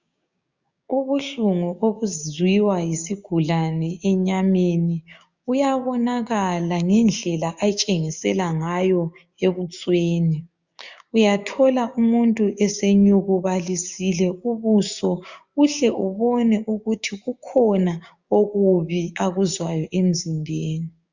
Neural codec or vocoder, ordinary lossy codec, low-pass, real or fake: codec, 16 kHz, 6 kbps, DAC; Opus, 64 kbps; 7.2 kHz; fake